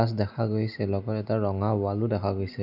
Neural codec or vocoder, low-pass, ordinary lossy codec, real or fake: none; 5.4 kHz; none; real